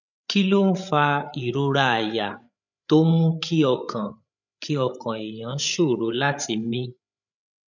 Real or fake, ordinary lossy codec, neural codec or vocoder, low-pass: fake; none; codec, 16 kHz, 8 kbps, FreqCodec, larger model; 7.2 kHz